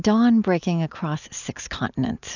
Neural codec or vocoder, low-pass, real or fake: none; 7.2 kHz; real